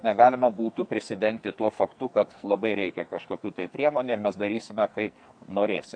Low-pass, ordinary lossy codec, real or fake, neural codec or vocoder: 9.9 kHz; AAC, 64 kbps; fake; codec, 44.1 kHz, 2.6 kbps, SNAC